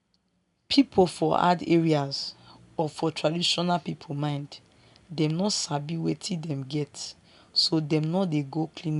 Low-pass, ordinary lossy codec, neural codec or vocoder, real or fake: 10.8 kHz; none; none; real